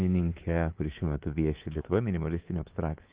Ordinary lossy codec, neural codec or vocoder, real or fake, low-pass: Opus, 16 kbps; codec, 24 kHz, 3.1 kbps, DualCodec; fake; 3.6 kHz